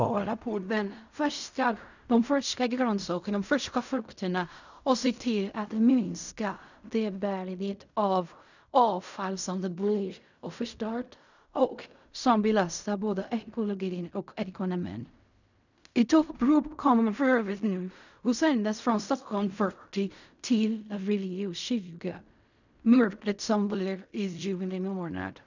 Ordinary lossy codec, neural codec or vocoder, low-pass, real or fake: none; codec, 16 kHz in and 24 kHz out, 0.4 kbps, LongCat-Audio-Codec, fine tuned four codebook decoder; 7.2 kHz; fake